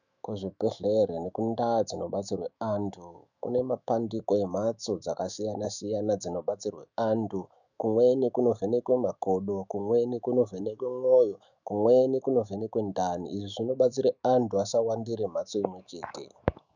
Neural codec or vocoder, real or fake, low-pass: autoencoder, 48 kHz, 128 numbers a frame, DAC-VAE, trained on Japanese speech; fake; 7.2 kHz